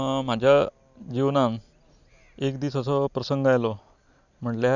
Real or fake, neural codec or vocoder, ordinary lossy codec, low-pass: real; none; none; 7.2 kHz